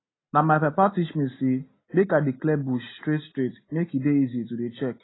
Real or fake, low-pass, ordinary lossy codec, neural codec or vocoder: real; 7.2 kHz; AAC, 16 kbps; none